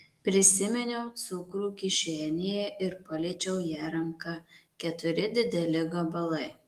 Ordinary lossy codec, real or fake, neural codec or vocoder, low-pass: Opus, 24 kbps; fake; autoencoder, 48 kHz, 128 numbers a frame, DAC-VAE, trained on Japanese speech; 14.4 kHz